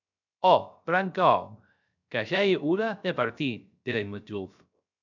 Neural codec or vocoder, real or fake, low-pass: codec, 16 kHz, 0.3 kbps, FocalCodec; fake; 7.2 kHz